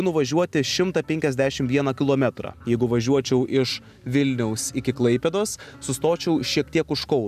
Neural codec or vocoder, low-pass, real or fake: none; 14.4 kHz; real